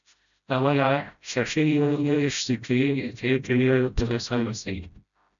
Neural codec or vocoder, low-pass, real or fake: codec, 16 kHz, 0.5 kbps, FreqCodec, smaller model; 7.2 kHz; fake